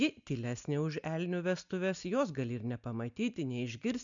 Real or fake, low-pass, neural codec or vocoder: real; 7.2 kHz; none